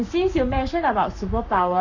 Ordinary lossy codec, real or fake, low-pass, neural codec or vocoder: none; fake; 7.2 kHz; codec, 44.1 kHz, 7.8 kbps, Pupu-Codec